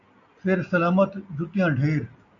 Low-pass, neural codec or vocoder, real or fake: 7.2 kHz; none; real